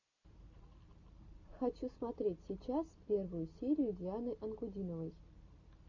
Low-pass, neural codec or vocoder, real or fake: 7.2 kHz; none; real